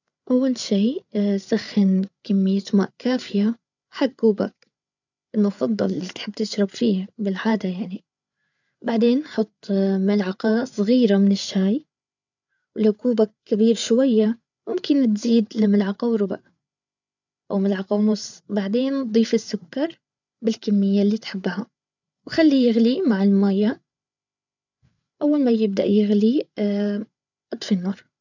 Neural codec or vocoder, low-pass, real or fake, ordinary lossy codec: codec, 16 kHz, 8 kbps, FreqCodec, larger model; 7.2 kHz; fake; none